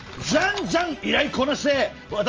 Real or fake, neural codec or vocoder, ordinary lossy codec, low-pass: real; none; Opus, 24 kbps; 7.2 kHz